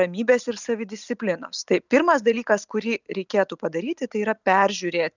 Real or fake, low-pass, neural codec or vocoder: real; 7.2 kHz; none